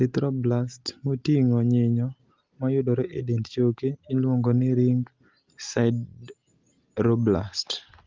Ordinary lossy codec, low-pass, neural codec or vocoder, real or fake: Opus, 32 kbps; 7.2 kHz; none; real